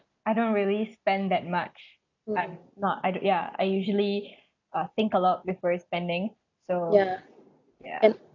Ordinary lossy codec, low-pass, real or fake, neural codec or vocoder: none; 7.2 kHz; real; none